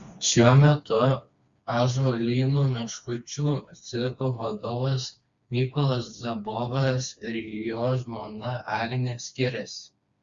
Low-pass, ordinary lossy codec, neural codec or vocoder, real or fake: 7.2 kHz; Opus, 64 kbps; codec, 16 kHz, 2 kbps, FreqCodec, smaller model; fake